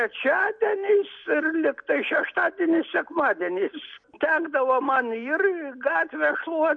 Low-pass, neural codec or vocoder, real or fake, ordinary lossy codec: 9.9 kHz; none; real; MP3, 48 kbps